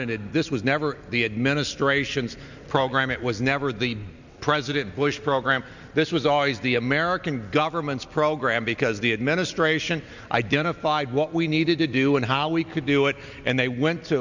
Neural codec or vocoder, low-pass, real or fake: none; 7.2 kHz; real